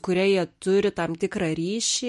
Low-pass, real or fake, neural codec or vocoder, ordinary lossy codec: 14.4 kHz; real; none; MP3, 48 kbps